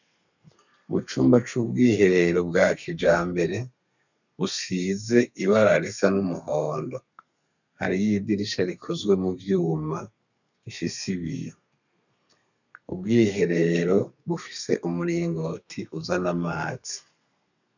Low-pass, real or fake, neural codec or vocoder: 7.2 kHz; fake; codec, 32 kHz, 1.9 kbps, SNAC